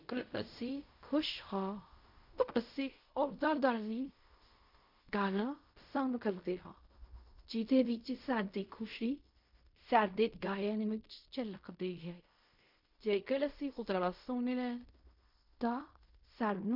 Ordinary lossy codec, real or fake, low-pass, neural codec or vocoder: MP3, 48 kbps; fake; 5.4 kHz; codec, 16 kHz in and 24 kHz out, 0.4 kbps, LongCat-Audio-Codec, fine tuned four codebook decoder